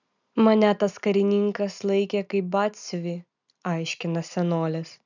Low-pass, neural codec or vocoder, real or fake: 7.2 kHz; none; real